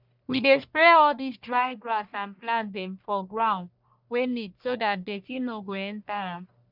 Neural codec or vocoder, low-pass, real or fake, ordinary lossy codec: codec, 44.1 kHz, 1.7 kbps, Pupu-Codec; 5.4 kHz; fake; none